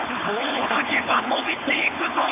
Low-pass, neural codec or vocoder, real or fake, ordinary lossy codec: 3.6 kHz; codec, 16 kHz, 4.8 kbps, FACodec; fake; AAC, 16 kbps